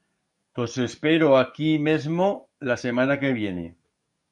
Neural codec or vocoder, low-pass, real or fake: codec, 44.1 kHz, 7.8 kbps, DAC; 10.8 kHz; fake